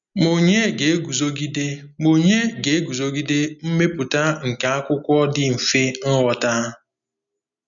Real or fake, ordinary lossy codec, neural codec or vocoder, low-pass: real; MP3, 96 kbps; none; 7.2 kHz